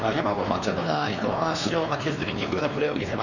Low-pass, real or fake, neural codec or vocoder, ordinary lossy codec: 7.2 kHz; fake; codec, 16 kHz, 2 kbps, X-Codec, WavLM features, trained on Multilingual LibriSpeech; none